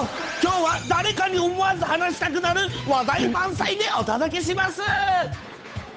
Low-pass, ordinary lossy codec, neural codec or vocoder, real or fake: none; none; codec, 16 kHz, 8 kbps, FunCodec, trained on Chinese and English, 25 frames a second; fake